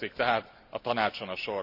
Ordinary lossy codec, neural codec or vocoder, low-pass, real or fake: none; none; 5.4 kHz; real